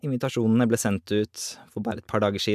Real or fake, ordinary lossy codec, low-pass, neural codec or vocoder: real; MP3, 96 kbps; 14.4 kHz; none